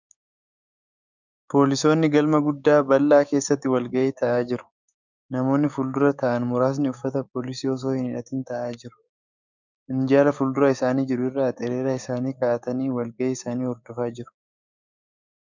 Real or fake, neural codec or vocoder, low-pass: fake; codec, 16 kHz, 6 kbps, DAC; 7.2 kHz